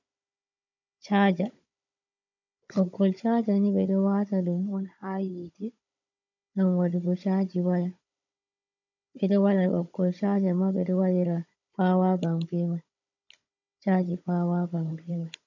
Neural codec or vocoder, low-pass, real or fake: codec, 16 kHz, 16 kbps, FunCodec, trained on Chinese and English, 50 frames a second; 7.2 kHz; fake